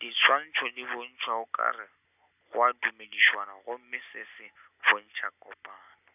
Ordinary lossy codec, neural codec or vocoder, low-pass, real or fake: none; none; 3.6 kHz; real